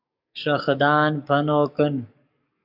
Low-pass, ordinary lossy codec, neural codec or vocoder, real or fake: 5.4 kHz; AAC, 48 kbps; codec, 44.1 kHz, 7.8 kbps, DAC; fake